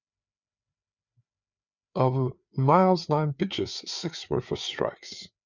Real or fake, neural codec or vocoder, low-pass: fake; codec, 16 kHz, 4 kbps, FreqCodec, larger model; 7.2 kHz